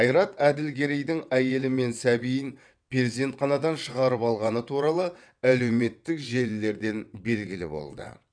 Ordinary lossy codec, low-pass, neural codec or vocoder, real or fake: none; 9.9 kHz; vocoder, 22.05 kHz, 80 mel bands, WaveNeXt; fake